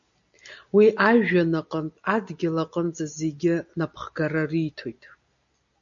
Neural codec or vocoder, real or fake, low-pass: none; real; 7.2 kHz